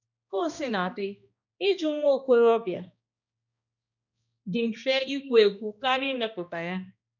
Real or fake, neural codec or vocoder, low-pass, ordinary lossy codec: fake; codec, 16 kHz, 1 kbps, X-Codec, HuBERT features, trained on balanced general audio; 7.2 kHz; none